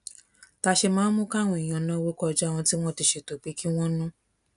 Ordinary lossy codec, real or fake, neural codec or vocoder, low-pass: none; real; none; 10.8 kHz